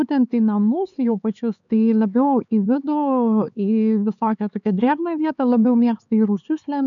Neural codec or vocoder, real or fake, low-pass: codec, 16 kHz, 4 kbps, X-Codec, HuBERT features, trained on LibriSpeech; fake; 7.2 kHz